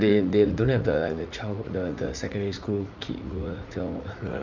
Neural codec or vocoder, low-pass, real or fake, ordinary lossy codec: vocoder, 44.1 kHz, 80 mel bands, Vocos; 7.2 kHz; fake; none